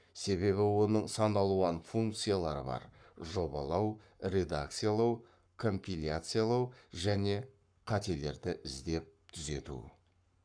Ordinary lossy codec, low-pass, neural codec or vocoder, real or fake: none; 9.9 kHz; codec, 44.1 kHz, 7.8 kbps, Pupu-Codec; fake